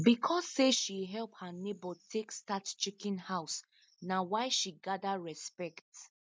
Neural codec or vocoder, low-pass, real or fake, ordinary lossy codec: none; none; real; none